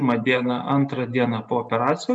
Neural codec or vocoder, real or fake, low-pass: none; real; 9.9 kHz